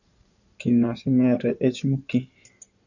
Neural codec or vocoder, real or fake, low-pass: codec, 16 kHz in and 24 kHz out, 2.2 kbps, FireRedTTS-2 codec; fake; 7.2 kHz